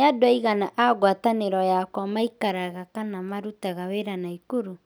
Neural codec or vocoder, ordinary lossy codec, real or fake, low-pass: none; none; real; none